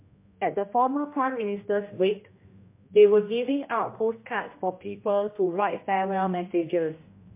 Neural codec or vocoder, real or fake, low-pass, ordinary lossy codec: codec, 16 kHz, 1 kbps, X-Codec, HuBERT features, trained on general audio; fake; 3.6 kHz; MP3, 24 kbps